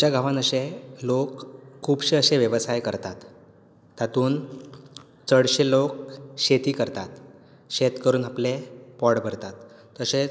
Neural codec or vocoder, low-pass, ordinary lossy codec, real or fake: none; none; none; real